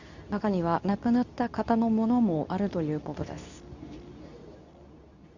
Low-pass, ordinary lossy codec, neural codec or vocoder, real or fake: 7.2 kHz; none; codec, 24 kHz, 0.9 kbps, WavTokenizer, medium speech release version 2; fake